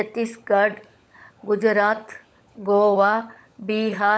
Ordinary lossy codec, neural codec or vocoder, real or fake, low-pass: none; codec, 16 kHz, 16 kbps, FunCodec, trained on LibriTTS, 50 frames a second; fake; none